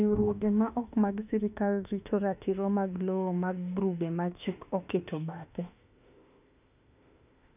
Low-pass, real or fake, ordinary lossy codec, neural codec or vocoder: 3.6 kHz; fake; none; autoencoder, 48 kHz, 32 numbers a frame, DAC-VAE, trained on Japanese speech